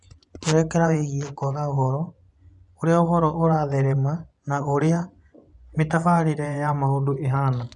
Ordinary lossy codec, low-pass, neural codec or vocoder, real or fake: none; 10.8 kHz; vocoder, 24 kHz, 100 mel bands, Vocos; fake